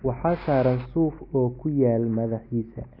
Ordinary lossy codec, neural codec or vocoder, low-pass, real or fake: MP3, 32 kbps; none; 5.4 kHz; real